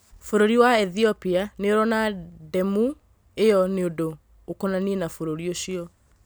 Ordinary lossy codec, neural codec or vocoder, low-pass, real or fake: none; none; none; real